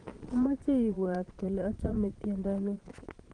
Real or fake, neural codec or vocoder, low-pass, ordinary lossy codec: fake; vocoder, 22.05 kHz, 80 mel bands, Vocos; 9.9 kHz; Opus, 24 kbps